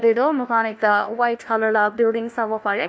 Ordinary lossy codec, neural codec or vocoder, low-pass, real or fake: none; codec, 16 kHz, 1 kbps, FunCodec, trained on LibriTTS, 50 frames a second; none; fake